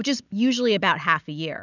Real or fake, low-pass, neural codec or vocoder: real; 7.2 kHz; none